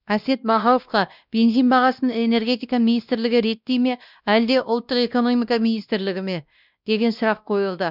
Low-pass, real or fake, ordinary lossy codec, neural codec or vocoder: 5.4 kHz; fake; none; codec, 16 kHz, 1 kbps, X-Codec, WavLM features, trained on Multilingual LibriSpeech